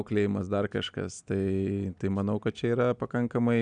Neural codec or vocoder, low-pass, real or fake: none; 9.9 kHz; real